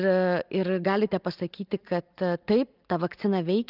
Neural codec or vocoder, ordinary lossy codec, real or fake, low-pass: none; Opus, 32 kbps; real; 5.4 kHz